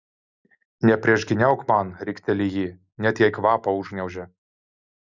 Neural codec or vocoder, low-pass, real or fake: none; 7.2 kHz; real